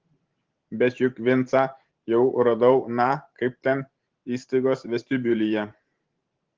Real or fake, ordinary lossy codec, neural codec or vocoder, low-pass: fake; Opus, 16 kbps; vocoder, 44.1 kHz, 128 mel bands every 512 samples, BigVGAN v2; 7.2 kHz